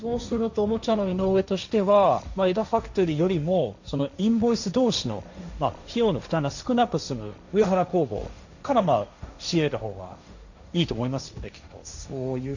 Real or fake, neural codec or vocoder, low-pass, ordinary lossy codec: fake; codec, 16 kHz, 1.1 kbps, Voila-Tokenizer; 7.2 kHz; none